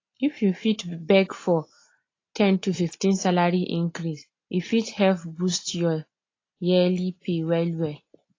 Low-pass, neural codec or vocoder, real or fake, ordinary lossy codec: 7.2 kHz; none; real; AAC, 32 kbps